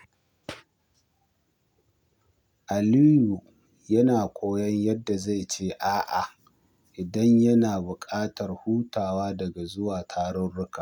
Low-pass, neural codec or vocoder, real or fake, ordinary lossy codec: 19.8 kHz; none; real; none